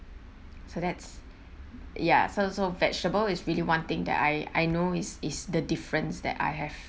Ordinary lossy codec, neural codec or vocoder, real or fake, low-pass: none; none; real; none